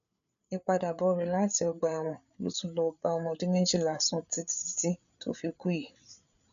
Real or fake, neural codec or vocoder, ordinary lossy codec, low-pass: fake; codec, 16 kHz, 8 kbps, FreqCodec, larger model; none; 7.2 kHz